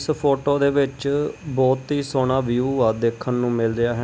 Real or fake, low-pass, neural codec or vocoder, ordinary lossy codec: real; none; none; none